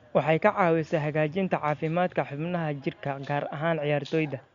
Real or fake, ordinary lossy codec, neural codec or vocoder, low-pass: real; Opus, 64 kbps; none; 7.2 kHz